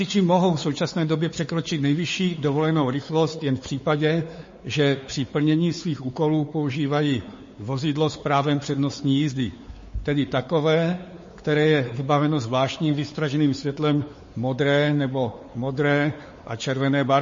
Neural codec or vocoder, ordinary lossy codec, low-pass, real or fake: codec, 16 kHz, 4 kbps, X-Codec, WavLM features, trained on Multilingual LibriSpeech; MP3, 32 kbps; 7.2 kHz; fake